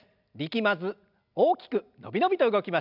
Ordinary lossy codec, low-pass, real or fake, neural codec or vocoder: none; 5.4 kHz; real; none